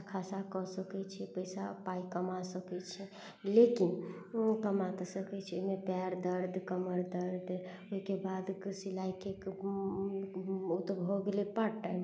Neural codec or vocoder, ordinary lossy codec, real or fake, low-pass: none; none; real; none